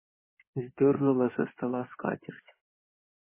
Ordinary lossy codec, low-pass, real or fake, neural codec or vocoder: MP3, 16 kbps; 3.6 kHz; fake; codec, 16 kHz in and 24 kHz out, 2.2 kbps, FireRedTTS-2 codec